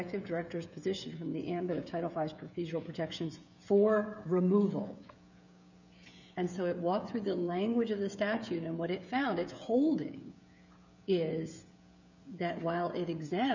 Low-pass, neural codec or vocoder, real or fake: 7.2 kHz; codec, 16 kHz, 16 kbps, FreqCodec, smaller model; fake